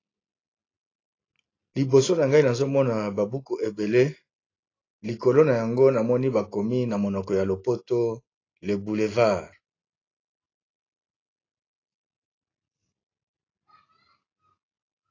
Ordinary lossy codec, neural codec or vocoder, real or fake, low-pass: AAC, 32 kbps; none; real; 7.2 kHz